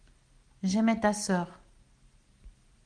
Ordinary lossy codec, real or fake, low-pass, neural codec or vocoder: Opus, 24 kbps; real; 9.9 kHz; none